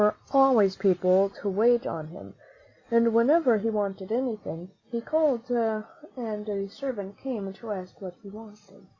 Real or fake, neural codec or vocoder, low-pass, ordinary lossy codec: real; none; 7.2 kHz; Opus, 64 kbps